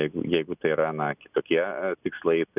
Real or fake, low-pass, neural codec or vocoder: real; 3.6 kHz; none